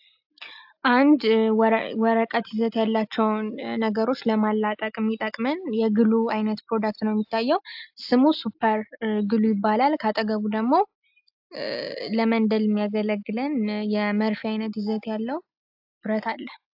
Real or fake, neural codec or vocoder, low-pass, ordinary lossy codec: real; none; 5.4 kHz; AAC, 48 kbps